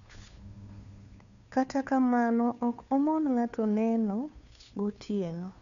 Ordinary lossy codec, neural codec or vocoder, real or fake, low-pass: none; codec, 16 kHz, 2 kbps, FunCodec, trained on Chinese and English, 25 frames a second; fake; 7.2 kHz